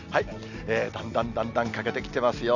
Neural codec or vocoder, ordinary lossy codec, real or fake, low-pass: none; none; real; 7.2 kHz